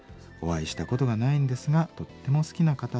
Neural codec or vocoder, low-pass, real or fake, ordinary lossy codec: none; none; real; none